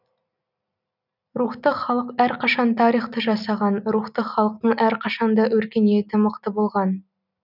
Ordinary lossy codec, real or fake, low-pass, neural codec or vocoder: none; real; 5.4 kHz; none